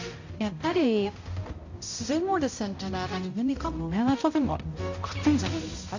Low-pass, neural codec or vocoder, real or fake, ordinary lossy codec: 7.2 kHz; codec, 16 kHz, 0.5 kbps, X-Codec, HuBERT features, trained on balanced general audio; fake; AAC, 48 kbps